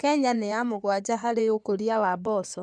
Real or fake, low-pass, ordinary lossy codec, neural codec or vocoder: fake; 9.9 kHz; none; codec, 16 kHz in and 24 kHz out, 2.2 kbps, FireRedTTS-2 codec